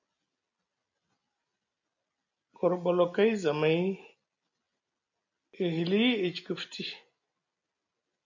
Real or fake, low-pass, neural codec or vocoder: real; 7.2 kHz; none